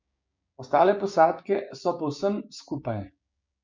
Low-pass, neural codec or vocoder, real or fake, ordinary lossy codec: 7.2 kHz; codec, 16 kHz, 6 kbps, DAC; fake; MP3, 48 kbps